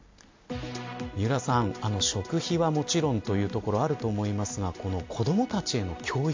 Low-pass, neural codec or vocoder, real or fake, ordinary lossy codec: 7.2 kHz; none; real; MP3, 48 kbps